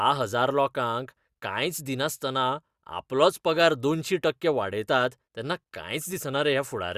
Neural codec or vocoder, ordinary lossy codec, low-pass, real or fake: none; Opus, 64 kbps; 14.4 kHz; real